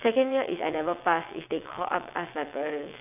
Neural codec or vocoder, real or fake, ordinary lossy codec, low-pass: vocoder, 22.05 kHz, 80 mel bands, WaveNeXt; fake; none; 3.6 kHz